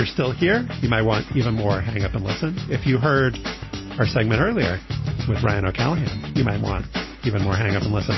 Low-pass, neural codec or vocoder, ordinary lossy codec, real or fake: 7.2 kHz; none; MP3, 24 kbps; real